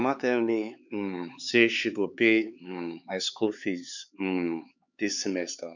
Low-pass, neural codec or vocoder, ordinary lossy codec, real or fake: 7.2 kHz; codec, 16 kHz, 4 kbps, X-Codec, HuBERT features, trained on LibriSpeech; none; fake